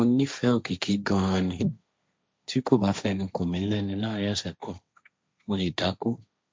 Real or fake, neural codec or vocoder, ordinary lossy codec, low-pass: fake; codec, 16 kHz, 1.1 kbps, Voila-Tokenizer; none; none